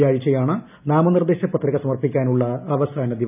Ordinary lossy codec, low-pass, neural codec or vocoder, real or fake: none; 3.6 kHz; none; real